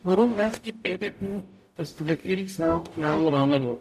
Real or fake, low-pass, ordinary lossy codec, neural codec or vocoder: fake; 14.4 kHz; none; codec, 44.1 kHz, 0.9 kbps, DAC